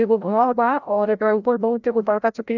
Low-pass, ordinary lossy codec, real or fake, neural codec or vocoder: 7.2 kHz; none; fake; codec, 16 kHz, 0.5 kbps, FreqCodec, larger model